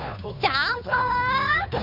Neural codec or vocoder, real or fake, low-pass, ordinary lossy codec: codec, 16 kHz, 2 kbps, FunCodec, trained on Chinese and English, 25 frames a second; fake; 5.4 kHz; none